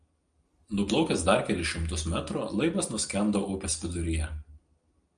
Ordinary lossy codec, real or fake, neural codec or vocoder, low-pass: Opus, 32 kbps; real; none; 9.9 kHz